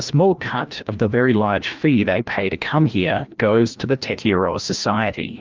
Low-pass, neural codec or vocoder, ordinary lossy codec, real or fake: 7.2 kHz; codec, 16 kHz, 1 kbps, FreqCodec, larger model; Opus, 32 kbps; fake